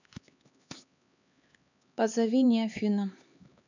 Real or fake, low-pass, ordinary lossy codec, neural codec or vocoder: fake; 7.2 kHz; none; codec, 16 kHz, 4 kbps, X-Codec, HuBERT features, trained on LibriSpeech